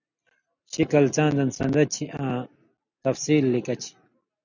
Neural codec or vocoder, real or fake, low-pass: none; real; 7.2 kHz